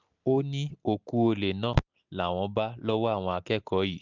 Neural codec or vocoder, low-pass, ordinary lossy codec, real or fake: none; 7.2 kHz; none; real